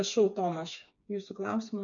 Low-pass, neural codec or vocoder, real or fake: 7.2 kHz; codec, 16 kHz, 4 kbps, FreqCodec, smaller model; fake